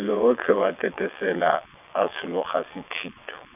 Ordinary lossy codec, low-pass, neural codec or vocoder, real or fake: none; 3.6 kHz; vocoder, 22.05 kHz, 80 mel bands, WaveNeXt; fake